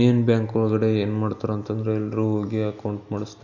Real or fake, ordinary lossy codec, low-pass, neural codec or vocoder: real; none; 7.2 kHz; none